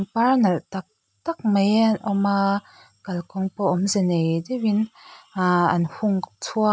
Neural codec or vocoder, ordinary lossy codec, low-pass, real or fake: none; none; none; real